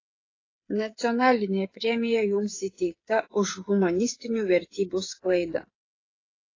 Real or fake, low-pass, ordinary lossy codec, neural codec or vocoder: fake; 7.2 kHz; AAC, 32 kbps; codec, 16 kHz, 8 kbps, FreqCodec, smaller model